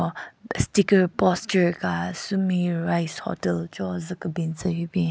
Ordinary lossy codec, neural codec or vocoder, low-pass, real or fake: none; none; none; real